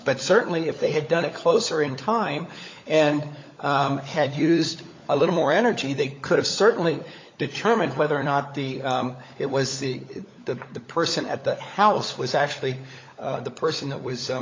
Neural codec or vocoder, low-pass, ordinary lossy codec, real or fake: codec, 16 kHz, 16 kbps, FunCodec, trained on LibriTTS, 50 frames a second; 7.2 kHz; MP3, 48 kbps; fake